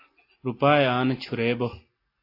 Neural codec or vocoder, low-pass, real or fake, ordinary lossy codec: none; 5.4 kHz; real; AAC, 32 kbps